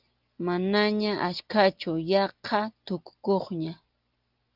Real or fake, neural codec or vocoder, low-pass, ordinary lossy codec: real; none; 5.4 kHz; Opus, 24 kbps